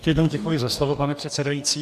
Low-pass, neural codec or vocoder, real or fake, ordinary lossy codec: 14.4 kHz; codec, 44.1 kHz, 2.6 kbps, DAC; fake; AAC, 96 kbps